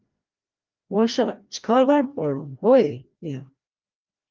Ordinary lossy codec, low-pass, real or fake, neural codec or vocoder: Opus, 32 kbps; 7.2 kHz; fake; codec, 16 kHz, 1 kbps, FreqCodec, larger model